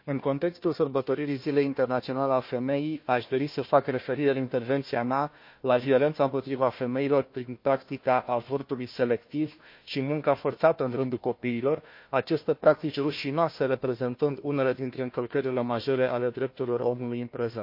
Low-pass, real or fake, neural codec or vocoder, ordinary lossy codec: 5.4 kHz; fake; codec, 16 kHz, 1 kbps, FunCodec, trained on Chinese and English, 50 frames a second; MP3, 32 kbps